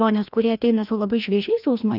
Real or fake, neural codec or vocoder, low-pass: fake; codec, 16 kHz, 1 kbps, FreqCodec, larger model; 5.4 kHz